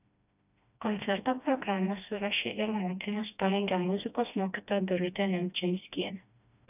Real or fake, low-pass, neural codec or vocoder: fake; 3.6 kHz; codec, 16 kHz, 1 kbps, FreqCodec, smaller model